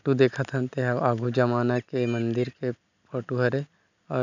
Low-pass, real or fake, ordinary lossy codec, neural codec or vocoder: 7.2 kHz; real; none; none